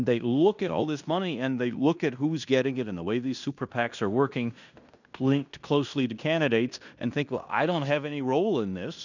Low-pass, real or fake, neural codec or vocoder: 7.2 kHz; fake; codec, 16 kHz in and 24 kHz out, 0.9 kbps, LongCat-Audio-Codec, fine tuned four codebook decoder